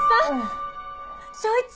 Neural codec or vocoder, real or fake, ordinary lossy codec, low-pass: none; real; none; none